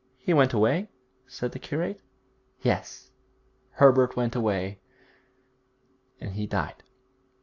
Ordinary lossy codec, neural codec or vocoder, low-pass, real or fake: AAC, 48 kbps; none; 7.2 kHz; real